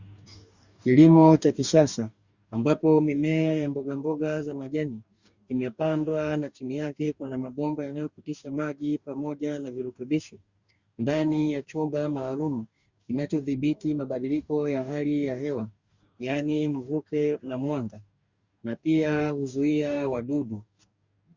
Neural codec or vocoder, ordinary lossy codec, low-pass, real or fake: codec, 44.1 kHz, 2.6 kbps, DAC; Opus, 64 kbps; 7.2 kHz; fake